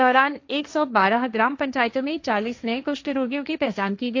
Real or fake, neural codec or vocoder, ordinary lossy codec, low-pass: fake; codec, 16 kHz, 1.1 kbps, Voila-Tokenizer; AAC, 48 kbps; 7.2 kHz